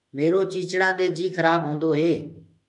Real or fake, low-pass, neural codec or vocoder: fake; 10.8 kHz; autoencoder, 48 kHz, 32 numbers a frame, DAC-VAE, trained on Japanese speech